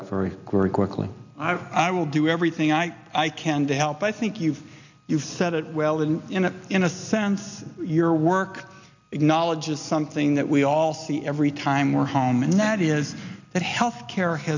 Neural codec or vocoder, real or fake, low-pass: none; real; 7.2 kHz